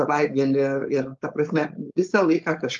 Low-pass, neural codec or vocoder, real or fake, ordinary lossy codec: 7.2 kHz; codec, 16 kHz, 4.8 kbps, FACodec; fake; Opus, 32 kbps